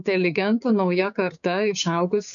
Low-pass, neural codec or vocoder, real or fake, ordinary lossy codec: 7.2 kHz; codec, 16 kHz, 6 kbps, DAC; fake; MP3, 96 kbps